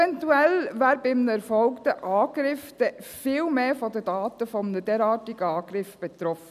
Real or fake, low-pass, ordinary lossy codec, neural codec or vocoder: real; 14.4 kHz; none; none